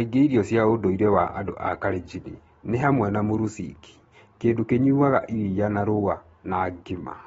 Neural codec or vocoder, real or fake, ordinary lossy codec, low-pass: none; real; AAC, 24 kbps; 19.8 kHz